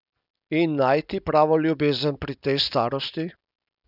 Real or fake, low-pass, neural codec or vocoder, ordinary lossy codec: real; 5.4 kHz; none; none